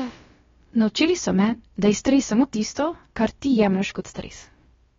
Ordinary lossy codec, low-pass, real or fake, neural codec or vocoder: AAC, 24 kbps; 7.2 kHz; fake; codec, 16 kHz, about 1 kbps, DyCAST, with the encoder's durations